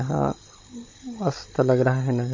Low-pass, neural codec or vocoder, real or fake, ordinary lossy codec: 7.2 kHz; codec, 16 kHz, 16 kbps, FunCodec, trained on Chinese and English, 50 frames a second; fake; MP3, 32 kbps